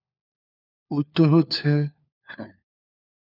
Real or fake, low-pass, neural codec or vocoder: fake; 5.4 kHz; codec, 16 kHz, 4 kbps, FunCodec, trained on LibriTTS, 50 frames a second